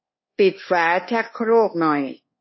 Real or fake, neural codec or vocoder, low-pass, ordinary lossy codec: fake; codec, 24 kHz, 1.2 kbps, DualCodec; 7.2 kHz; MP3, 24 kbps